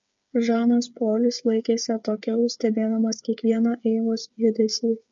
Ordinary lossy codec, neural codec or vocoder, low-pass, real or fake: MP3, 48 kbps; codec, 16 kHz, 8 kbps, FreqCodec, smaller model; 7.2 kHz; fake